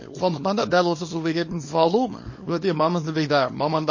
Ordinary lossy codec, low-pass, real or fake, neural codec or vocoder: MP3, 32 kbps; 7.2 kHz; fake; codec, 24 kHz, 0.9 kbps, WavTokenizer, small release